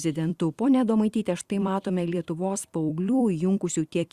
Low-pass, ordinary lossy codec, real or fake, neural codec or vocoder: 14.4 kHz; Opus, 64 kbps; fake; vocoder, 44.1 kHz, 128 mel bands every 256 samples, BigVGAN v2